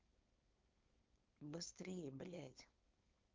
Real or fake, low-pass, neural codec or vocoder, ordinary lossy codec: fake; 7.2 kHz; codec, 16 kHz, 4.8 kbps, FACodec; Opus, 32 kbps